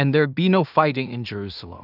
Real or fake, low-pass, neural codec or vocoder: fake; 5.4 kHz; codec, 16 kHz in and 24 kHz out, 0.4 kbps, LongCat-Audio-Codec, two codebook decoder